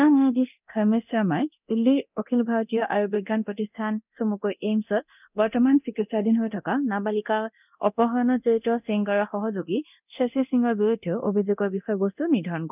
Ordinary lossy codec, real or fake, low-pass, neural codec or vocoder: none; fake; 3.6 kHz; codec, 24 kHz, 0.9 kbps, DualCodec